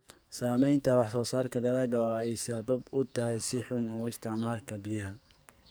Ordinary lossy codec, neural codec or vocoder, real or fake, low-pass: none; codec, 44.1 kHz, 2.6 kbps, SNAC; fake; none